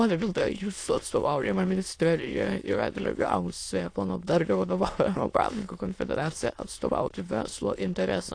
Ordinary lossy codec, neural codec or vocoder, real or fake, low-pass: AAC, 48 kbps; autoencoder, 22.05 kHz, a latent of 192 numbers a frame, VITS, trained on many speakers; fake; 9.9 kHz